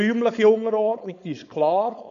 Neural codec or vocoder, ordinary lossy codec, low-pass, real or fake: codec, 16 kHz, 4.8 kbps, FACodec; none; 7.2 kHz; fake